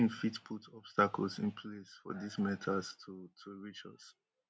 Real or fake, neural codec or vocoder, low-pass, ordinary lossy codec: real; none; none; none